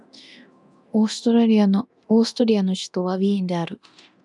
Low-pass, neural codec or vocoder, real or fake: 10.8 kHz; codec, 24 kHz, 0.9 kbps, DualCodec; fake